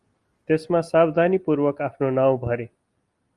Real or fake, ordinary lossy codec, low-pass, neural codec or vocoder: real; Opus, 32 kbps; 10.8 kHz; none